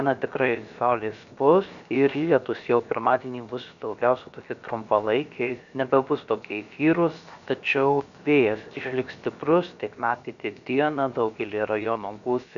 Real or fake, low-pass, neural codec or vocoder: fake; 7.2 kHz; codec, 16 kHz, about 1 kbps, DyCAST, with the encoder's durations